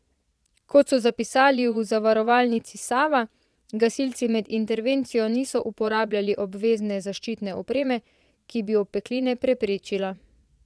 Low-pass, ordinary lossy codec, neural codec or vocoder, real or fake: none; none; vocoder, 22.05 kHz, 80 mel bands, Vocos; fake